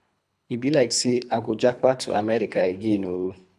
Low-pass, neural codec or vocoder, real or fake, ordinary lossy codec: none; codec, 24 kHz, 3 kbps, HILCodec; fake; none